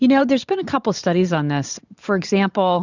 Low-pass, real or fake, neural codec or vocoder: 7.2 kHz; fake; vocoder, 44.1 kHz, 128 mel bands every 512 samples, BigVGAN v2